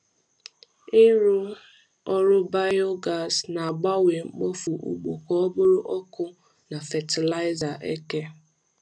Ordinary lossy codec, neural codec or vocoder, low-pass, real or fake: none; none; 9.9 kHz; real